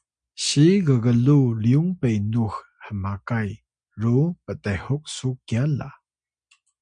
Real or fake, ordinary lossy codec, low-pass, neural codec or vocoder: real; MP3, 64 kbps; 9.9 kHz; none